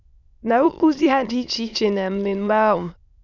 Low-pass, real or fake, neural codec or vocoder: 7.2 kHz; fake; autoencoder, 22.05 kHz, a latent of 192 numbers a frame, VITS, trained on many speakers